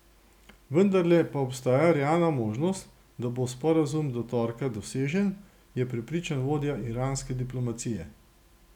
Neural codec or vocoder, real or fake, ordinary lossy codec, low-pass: none; real; none; 19.8 kHz